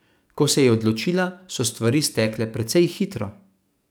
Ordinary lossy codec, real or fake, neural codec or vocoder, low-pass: none; fake; codec, 44.1 kHz, 7.8 kbps, DAC; none